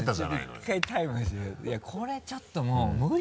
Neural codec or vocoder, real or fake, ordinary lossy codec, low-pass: none; real; none; none